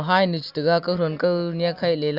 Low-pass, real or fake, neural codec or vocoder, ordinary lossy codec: 5.4 kHz; real; none; Opus, 64 kbps